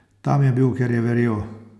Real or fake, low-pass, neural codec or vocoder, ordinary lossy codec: real; none; none; none